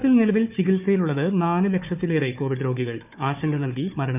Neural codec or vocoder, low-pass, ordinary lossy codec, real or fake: codec, 16 kHz, 4 kbps, FunCodec, trained on Chinese and English, 50 frames a second; 3.6 kHz; none; fake